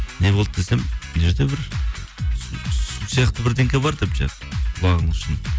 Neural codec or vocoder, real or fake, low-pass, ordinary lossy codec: none; real; none; none